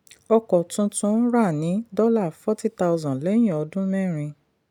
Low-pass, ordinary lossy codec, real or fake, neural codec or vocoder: 19.8 kHz; none; real; none